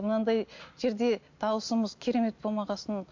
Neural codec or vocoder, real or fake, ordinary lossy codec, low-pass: none; real; MP3, 48 kbps; 7.2 kHz